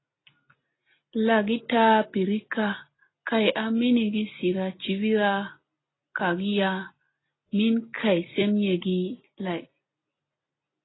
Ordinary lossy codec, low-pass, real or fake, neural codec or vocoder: AAC, 16 kbps; 7.2 kHz; real; none